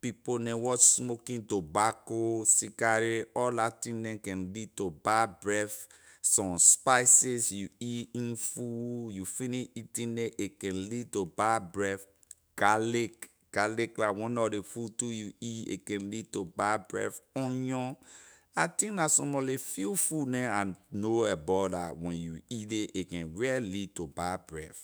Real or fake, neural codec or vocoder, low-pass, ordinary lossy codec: fake; autoencoder, 48 kHz, 128 numbers a frame, DAC-VAE, trained on Japanese speech; none; none